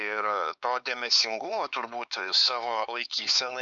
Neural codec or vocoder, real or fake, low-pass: codec, 16 kHz, 4 kbps, X-Codec, HuBERT features, trained on LibriSpeech; fake; 7.2 kHz